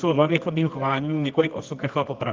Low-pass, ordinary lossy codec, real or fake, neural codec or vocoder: 7.2 kHz; Opus, 24 kbps; fake; codec, 24 kHz, 0.9 kbps, WavTokenizer, medium music audio release